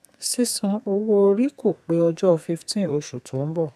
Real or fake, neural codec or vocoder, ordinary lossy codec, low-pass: fake; codec, 32 kHz, 1.9 kbps, SNAC; none; 14.4 kHz